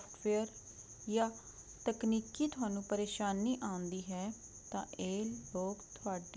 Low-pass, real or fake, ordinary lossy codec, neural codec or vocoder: none; real; none; none